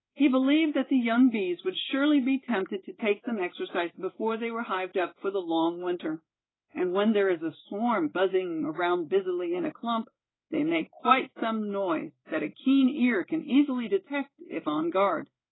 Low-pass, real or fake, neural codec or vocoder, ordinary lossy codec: 7.2 kHz; fake; codec, 16 kHz in and 24 kHz out, 1 kbps, XY-Tokenizer; AAC, 16 kbps